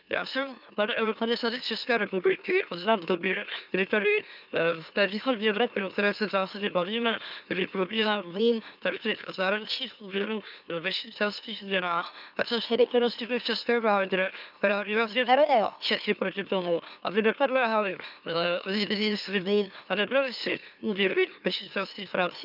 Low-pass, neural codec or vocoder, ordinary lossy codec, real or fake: 5.4 kHz; autoencoder, 44.1 kHz, a latent of 192 numbers a frame, MeloTTS; none; fake